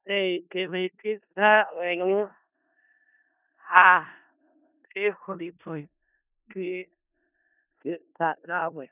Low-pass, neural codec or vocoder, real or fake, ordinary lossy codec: 3.6 kHz; codec, 16 kHz in and 24 kHz out, 0.4 kbps, LongCat-Audio-Codec, four codebook decoder; fake; none